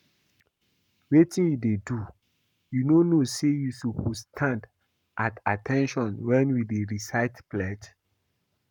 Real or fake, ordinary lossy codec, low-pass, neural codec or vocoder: fake; none; 19.8 kHz; codec, 44.1 kHz, 7.8 kbps, Pupu-Codec